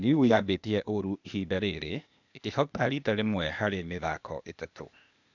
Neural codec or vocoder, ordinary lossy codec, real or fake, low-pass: codec, 16 kHz, 0.8 kbps, ZipCodec; none; fake; 7.2 kHz